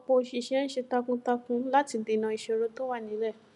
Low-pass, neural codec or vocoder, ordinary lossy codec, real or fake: 10.8 kHz; none; none; real